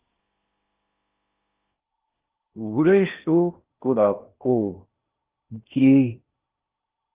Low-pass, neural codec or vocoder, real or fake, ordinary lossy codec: 3.6 kHz; codec, 16 kHz in and 24 kHz out, 0.6 kbps, FocalCodec, streaming, 4096 codes; fake; Opus, 32 kbps